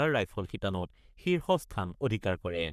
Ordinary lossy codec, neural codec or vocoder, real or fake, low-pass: none; codec, 44.1 kHz, 3.4 kbps, Pupu-Codec; fake; 14.4 kHz